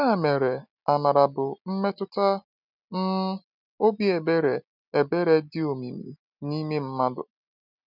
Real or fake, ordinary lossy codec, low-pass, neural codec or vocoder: real; none; 5.4 kHz; none